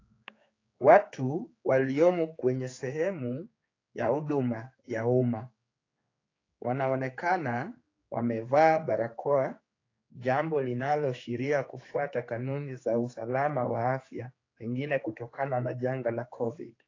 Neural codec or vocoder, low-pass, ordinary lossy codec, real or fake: codec, 16 kHz, 4 kbps, X-Codec, HuBERT features, trained on general audio; 7.2 kHz; AAC, 32 kbps; fake